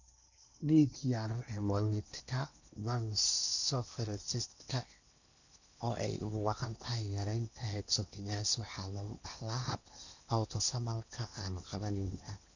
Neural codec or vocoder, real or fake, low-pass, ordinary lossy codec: codec, 16 kHz in and 24 kHz out, 0.8 kbps, FocalCodec, streaming, 65536 codes; fake; 7.2 kHz; none